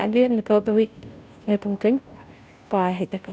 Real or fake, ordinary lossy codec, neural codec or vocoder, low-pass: fake; none; codec, 16 kHz, 0.5 kbps, FunCodec, trained on Chinese and English, 25 frames a second; none